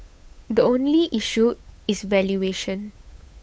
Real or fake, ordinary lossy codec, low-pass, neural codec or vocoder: fake; none; none; codec, 16 kHz, 8 kbps, FunCodec, trained on Chinese and English, 25 frames a second